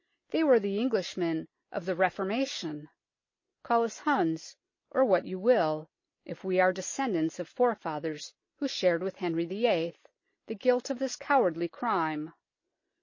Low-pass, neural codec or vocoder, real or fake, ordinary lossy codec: 7.2 kHz; none; real; MP3, 32 kbps